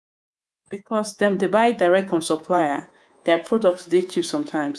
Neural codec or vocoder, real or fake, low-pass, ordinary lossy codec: codec, 24 kHz, 3.1 kbps, DualCodec; fake; none; none